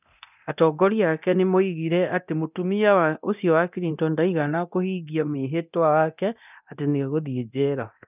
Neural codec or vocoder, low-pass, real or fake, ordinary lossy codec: codec, 24 kHz, 0.9 kbps, DualCodec; 3.6 kHz; fake; none